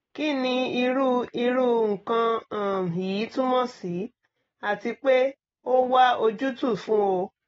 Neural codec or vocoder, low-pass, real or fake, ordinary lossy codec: none; 7.2 kHz; real; AAC, 24 kbps